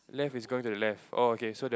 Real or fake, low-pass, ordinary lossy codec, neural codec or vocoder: real; none; none; none